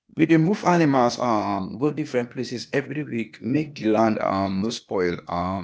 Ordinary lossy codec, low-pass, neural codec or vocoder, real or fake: none; none; codec, 16 kHz, 0.8 kbps, ZipCodec; fake